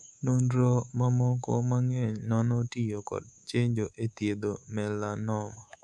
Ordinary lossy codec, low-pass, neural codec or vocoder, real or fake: none; none; codec, 24 kHz, 3.1 kbps, DualCodec; fake